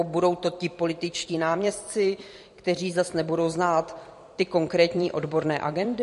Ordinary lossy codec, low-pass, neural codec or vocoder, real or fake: MP3, 48 kbps; 14.4 kHz; vocoder, 44.1 kHz, 128 mel bands every 256 samples, BigVGAN v2; fake